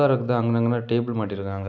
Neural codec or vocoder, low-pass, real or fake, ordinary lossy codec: none; 7.2 kHz; real; none